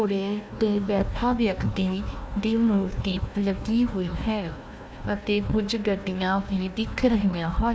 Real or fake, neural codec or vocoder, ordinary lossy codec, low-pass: fake; codec, 16 kHz, 1 kbps, FunCodec, trained on Chinese and English, 50 frames a second; none; none